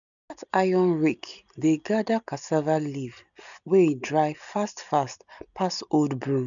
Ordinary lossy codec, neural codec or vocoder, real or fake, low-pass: none; none; real; 7.2 kHz